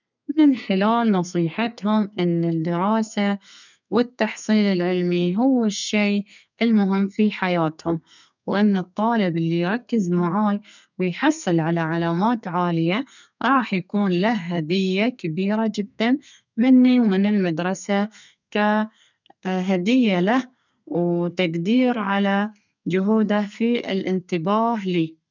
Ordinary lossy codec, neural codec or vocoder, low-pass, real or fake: none; codec, 32 kHz, 1.9 kbps, SNAC; 7.2 kHz; fake